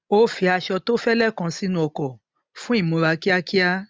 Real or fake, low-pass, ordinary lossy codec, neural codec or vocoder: real; none; none; none